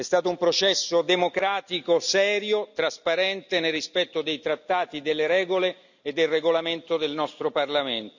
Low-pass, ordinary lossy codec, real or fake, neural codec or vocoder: 7.2 kHz; none; real; none